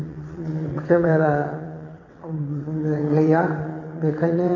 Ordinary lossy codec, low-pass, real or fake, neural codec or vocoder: none; 7.2 kHz; fake; vocoder, 22.05 kHz, 80 mel bands, WaveNeXt